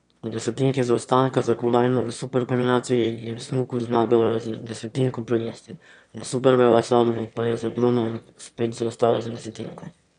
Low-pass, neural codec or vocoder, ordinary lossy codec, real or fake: 9.9 kHz; autoencoder, 22.05 kHz, a latent of 192 numbers a frame, VITS, trained on one speaker; none; fake